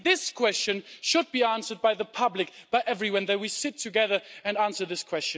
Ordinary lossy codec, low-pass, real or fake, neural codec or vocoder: none; none; real; none